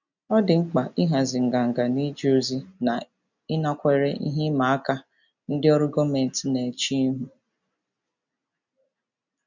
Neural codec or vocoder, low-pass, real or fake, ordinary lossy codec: none; 7.2 kHz; real; none